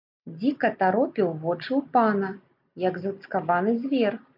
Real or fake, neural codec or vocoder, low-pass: real; none; 5.4 kHz